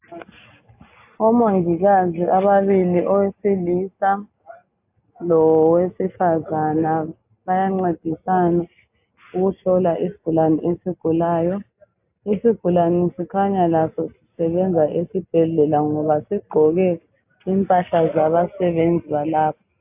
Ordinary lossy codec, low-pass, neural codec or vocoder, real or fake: AAC, 32 kbps; 3.6 kHz; none; real